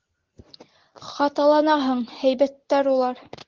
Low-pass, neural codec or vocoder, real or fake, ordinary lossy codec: 7.2 kHz; none; real; Opus, 16 kbps